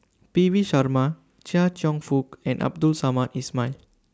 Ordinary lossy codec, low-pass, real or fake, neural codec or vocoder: none; none; real; none